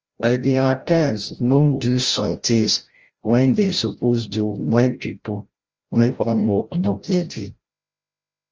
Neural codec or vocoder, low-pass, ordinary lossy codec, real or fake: codec, 16 kHz, 0.5 kbps, FreqCodec, larger model; 7.2 kHz; Opus, 24 kbps; fake